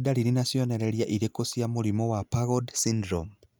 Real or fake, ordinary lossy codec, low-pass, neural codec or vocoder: real; none; none; none